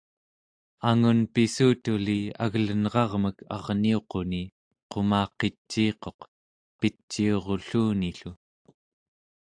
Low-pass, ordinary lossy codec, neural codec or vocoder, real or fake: 9.9 kHz; AAC, 64 kbps; none; real